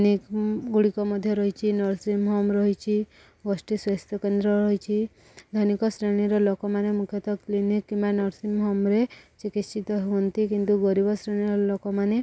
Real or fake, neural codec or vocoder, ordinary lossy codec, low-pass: real; none; none; none